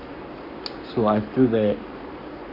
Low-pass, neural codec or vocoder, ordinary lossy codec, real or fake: 5.4 kHz; codec, 44.1 kHz, 7.8 kbps, Pupu-Codec; AAC, 32 kbps; fake